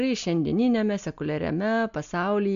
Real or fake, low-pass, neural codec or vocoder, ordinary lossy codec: real; 7.2 kHz; none; AAC, 64 kbps